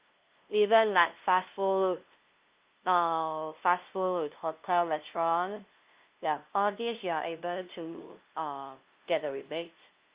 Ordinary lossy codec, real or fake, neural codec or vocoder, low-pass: Opus, 32 kbps; fake; codec, 16 kHz, 0.5 kbps, FunCodec, trained on LibriTTS, 25 frames a second; 3.6 kHz